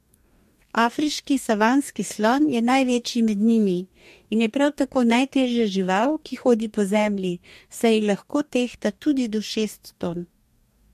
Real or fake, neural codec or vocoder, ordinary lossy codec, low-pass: fake; codec, 44.1 kHz, 2.6 kbps, DAC; MP3, 64 kbps; 14.4 kHz